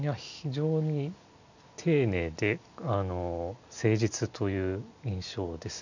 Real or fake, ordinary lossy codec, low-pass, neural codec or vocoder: real; none; 7.2 kHz; none